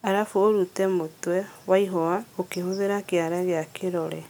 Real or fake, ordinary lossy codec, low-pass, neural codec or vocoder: real; none; none; none